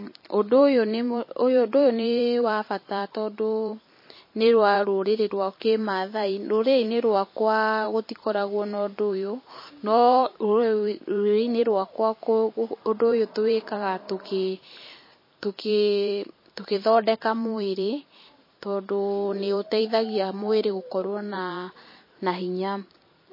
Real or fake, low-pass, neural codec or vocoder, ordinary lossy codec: fake; 5.4 kHz; vocoder, 44.1 kHz, 128 mel bands every 512 samples, BigVGAN v2; MP3, 24 kbps